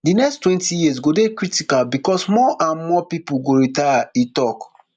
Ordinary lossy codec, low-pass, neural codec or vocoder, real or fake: none; 9.9 kHz; none; real